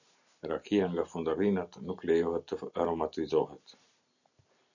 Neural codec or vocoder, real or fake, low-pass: none; real; 7.2 kHz